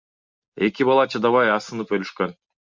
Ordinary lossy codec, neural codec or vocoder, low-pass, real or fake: MP3, 64 kbps; none; 7.2 kHz; real